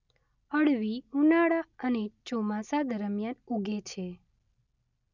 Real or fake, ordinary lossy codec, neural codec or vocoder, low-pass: real; none; none; 7.2 kHz